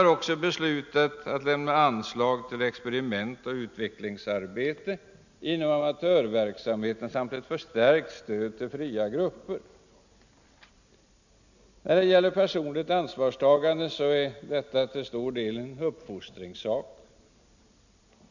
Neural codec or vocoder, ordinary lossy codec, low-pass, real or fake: none; none; 7.2 kHz; real